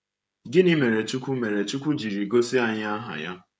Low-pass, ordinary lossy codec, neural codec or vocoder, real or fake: none; none; codec, 16 kHz, 16 kbps, FreqCodec, smaller model; fake